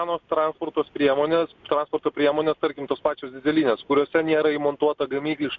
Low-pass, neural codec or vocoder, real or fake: 7.2 kHz; none; real